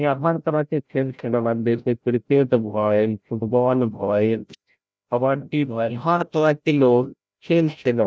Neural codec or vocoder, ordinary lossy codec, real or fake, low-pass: codec, 16 kHz, 0.5 kbps, FreqCodec, larger model; none; fake; none